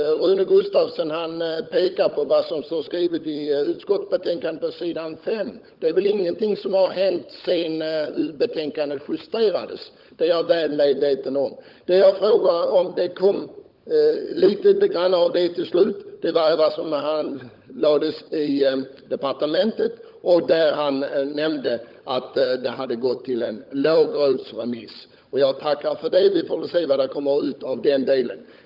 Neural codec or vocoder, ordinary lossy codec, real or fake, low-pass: codec, 16 kHz, 16 kbps, FunCodec, trained on LibriTTS, 50 frames a second; Opus, 24 kbps; fake; 5.4 kHz